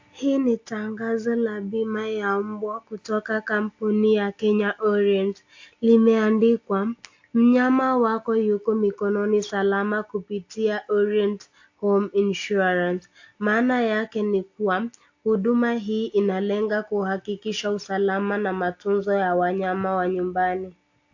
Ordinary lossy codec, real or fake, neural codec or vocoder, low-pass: AAC, 48 kbps; real; none; 7.2 kHz